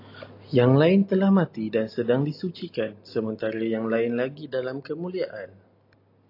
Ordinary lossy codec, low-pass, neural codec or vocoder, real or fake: AAC, 32 kbps; 5.4 kHz; none; real